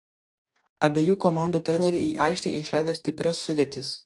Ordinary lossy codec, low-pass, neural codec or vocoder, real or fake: AAC, 64 kbps; 10.8 kHz; codec, 44.1 kHz, 2.6 kbps, DAC; fake